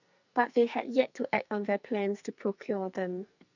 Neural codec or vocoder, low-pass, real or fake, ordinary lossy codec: codec, 44.1 kHz, 2.6 kbps, SNAC; 7.2 kHz; fake; none